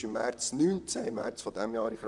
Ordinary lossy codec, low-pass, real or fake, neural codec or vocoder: none; 10.8 kHz; fake; vocoder, 44.1 kHz, 128 mel bands, Pupu-Vocoder